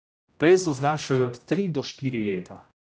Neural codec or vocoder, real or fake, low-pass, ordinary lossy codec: codec, 16 kHz, 0.5 kbps, X-Codec, HuBERT features, trained on general audio; fake; none; none